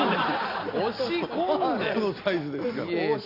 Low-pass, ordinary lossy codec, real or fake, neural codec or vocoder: 5.4 kHz; none; real; none